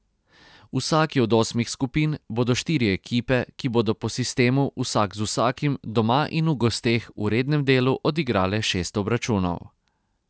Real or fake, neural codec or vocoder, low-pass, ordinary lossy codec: real; none; none; none